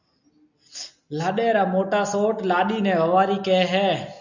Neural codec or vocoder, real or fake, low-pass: none; real; 7.2 kHz